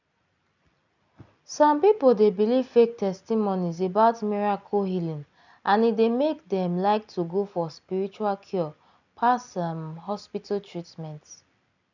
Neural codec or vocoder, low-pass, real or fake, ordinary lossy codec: none; 7.2 kHz; real; none